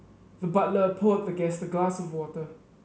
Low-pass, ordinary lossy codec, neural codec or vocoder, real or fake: none; none; none; real